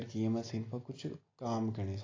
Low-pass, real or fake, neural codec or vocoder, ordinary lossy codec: 7.2 kHz; real; none; AAC, 32 kbps